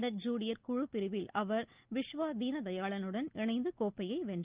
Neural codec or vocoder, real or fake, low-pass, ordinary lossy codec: none; real; 3.6 kHz; Opus, 32 kbps